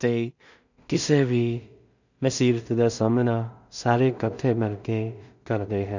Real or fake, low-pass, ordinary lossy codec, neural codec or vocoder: fake; 7.2 kHz; none; codec, 16 kHz in and 24 kHz out, 0.4 kbps, LongCat-Audio-Codec, two codebook decoder